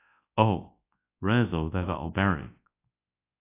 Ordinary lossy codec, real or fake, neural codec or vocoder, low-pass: AAC, 24 kbps; fake; codec, 24 kHz, 0.9 kbps, WavTokenizer, large speech release; 3.6 kHz